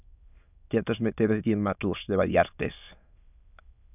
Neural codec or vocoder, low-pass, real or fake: autoencoder, 22.05 kHz, a latent of 192 numbers a frame, VITS, trained on many speakers; 3.6 kHz; fake